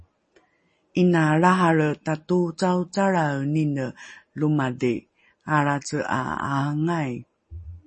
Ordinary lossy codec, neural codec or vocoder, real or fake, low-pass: MP3, 32 kbps; none; real; 9.9 kHz